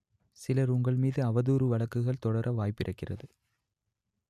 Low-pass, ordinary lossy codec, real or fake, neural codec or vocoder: 14.4 kHz; none; real; none